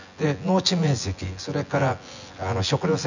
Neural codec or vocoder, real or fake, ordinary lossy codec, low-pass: vocoder, 24 kHz, 100 mel bands, Vocos; fake; none; 7.2 kHz